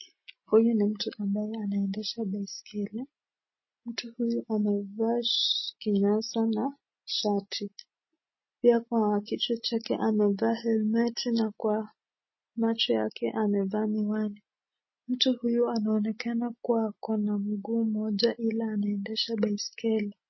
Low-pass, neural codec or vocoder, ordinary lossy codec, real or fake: 7.2 kHz; none; MP3, 24 kbps; real